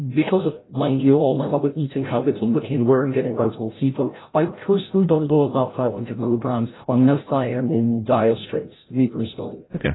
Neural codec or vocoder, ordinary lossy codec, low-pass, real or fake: codec, 16 kHz, 0.5 kbps, FreqCodec, larger model; AAC, 16 kbps; 7.2 kHz; fake